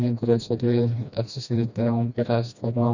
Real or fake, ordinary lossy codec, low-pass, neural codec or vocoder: fake; none; 7.2 kHz; codec, 16 kHz, 1 kbps, FreqCodec, smaller model